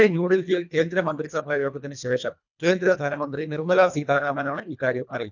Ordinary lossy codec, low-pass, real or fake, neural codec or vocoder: none; 7.2 kHz; fake; codec, 24 kHz, 1.5 kbps, HILCodec